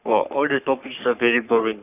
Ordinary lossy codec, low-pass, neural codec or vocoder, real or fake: none; 3.6 kHz; codec, 44.1 kHz, 3.4 kbps, Pupu-Codec; fake